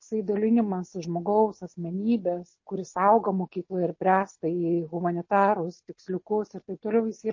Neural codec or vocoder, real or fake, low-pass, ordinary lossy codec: none; real; 7.2 kHz; MP3, 32 kbps